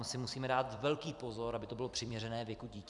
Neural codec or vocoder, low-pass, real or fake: vocoder, 44.1 kHz, 128 mel bands every 256 samples, BigVGAN v2; 10.8 kHz; fake